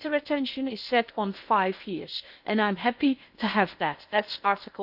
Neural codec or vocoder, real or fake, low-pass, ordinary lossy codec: codec, 16 kHz in and 24 kHz out, 0.8 kbps, FocalCodec, streaming, 65536 codes; fake; 5.4 kHz; none